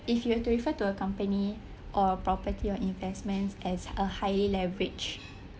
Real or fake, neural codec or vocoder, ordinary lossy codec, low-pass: real; none; none; none